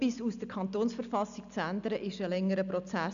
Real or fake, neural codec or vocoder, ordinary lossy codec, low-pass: real; none; none; 7.2 kHz